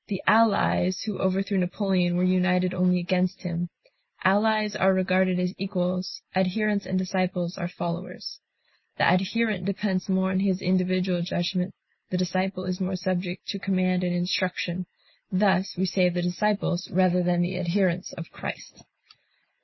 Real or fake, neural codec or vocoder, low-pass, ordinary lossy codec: real; none; 7.2 kHz; MP3, 24 kbps